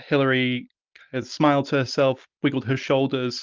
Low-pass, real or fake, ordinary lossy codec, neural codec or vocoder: 7.2 kHz; real; Opus, 32 kbps; none